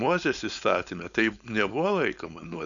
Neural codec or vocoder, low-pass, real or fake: codec, 16 kHz, 4.8 kbps, FACodec; 7.2 kHz; fake